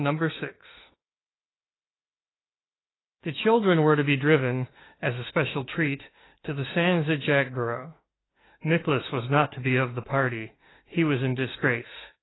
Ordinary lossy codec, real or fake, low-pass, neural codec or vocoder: AAC, 16 kbps; fake; 7.2 kHz; autoencoder, 48 kHz, 32 numbers a frame, DAC-VAE, trained on Japanese speech